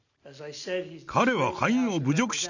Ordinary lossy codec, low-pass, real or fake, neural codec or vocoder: none; 7.2 kHz; real; none